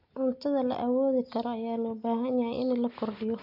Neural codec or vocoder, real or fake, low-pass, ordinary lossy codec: none; real; 5.4 kHz; AAC, 48 kbps